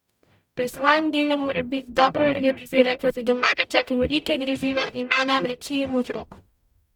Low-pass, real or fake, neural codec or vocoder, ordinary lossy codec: 19.8 kHz; fake; codec, 44.1 kHz, 0.9 kbps, DAC; none